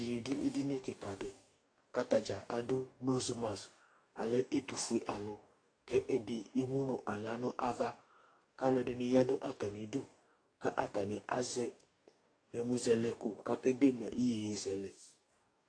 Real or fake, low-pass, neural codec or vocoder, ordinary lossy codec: fake; 9.9 kHz; codec, 44.1 kHz, 2.6 kbps, DAC; AAC, 48 kbps